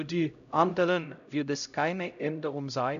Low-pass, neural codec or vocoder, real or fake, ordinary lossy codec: 7.2 kHz; codec, 16 kHz, 0.5 kbps, X-Codec, HuBERT features, trained on LibriSpeech; fake; none